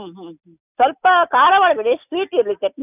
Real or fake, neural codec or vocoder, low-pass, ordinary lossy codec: real; none; 3.6 kHz; none